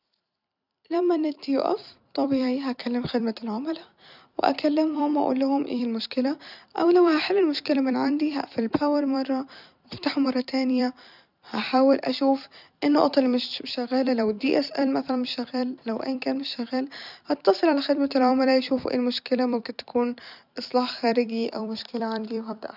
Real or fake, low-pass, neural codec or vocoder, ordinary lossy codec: fake; 5.4 kHz; vocoder, 44.1 kHz, 128 mel bands every 256 samples, BigVGAN v2; none